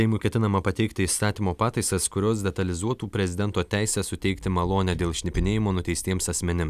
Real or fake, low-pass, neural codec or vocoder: real; 14.4 kHz; none